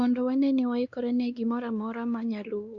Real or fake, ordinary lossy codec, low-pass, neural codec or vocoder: fake; Opus, 64 kbps; 7.2 kHz; codec, 16 kHz, 2 kbps, X-Codec, WavLM features, trained on Multilingual LibriSpeech